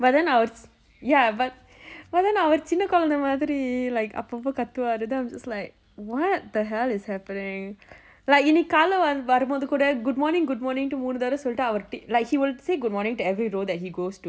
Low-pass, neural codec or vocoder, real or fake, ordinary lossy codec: none; none; real; none